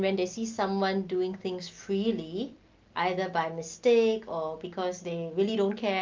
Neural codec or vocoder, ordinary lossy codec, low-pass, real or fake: none; Opus, 24 kbps; 7.2 kHz; real